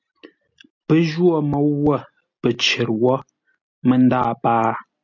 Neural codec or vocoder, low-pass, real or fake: none; 7.2 kHz; real